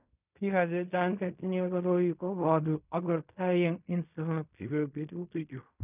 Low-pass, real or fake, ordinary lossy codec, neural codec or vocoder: 3.6 kHz; fake; none; codec, 16 kHz in and 24 kHz out, 0.4 kbps, LongCat-Audio-Codec, fine tuned four codebook decoder